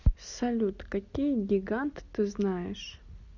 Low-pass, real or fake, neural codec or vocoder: 7.2 kHz; real; none